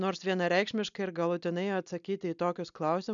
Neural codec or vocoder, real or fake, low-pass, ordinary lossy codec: none; real; 7.2 kHz; MP3, 96 kbps